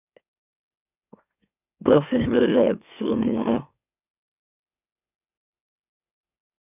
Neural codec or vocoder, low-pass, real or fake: autoencoder, 44.1 kHz, a latent of 192 numbers a frame, MeloTTS; 3.6 kHz; fake